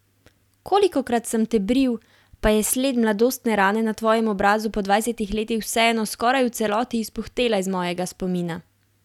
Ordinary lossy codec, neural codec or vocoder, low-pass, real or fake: none; none; 19.8 kHz; real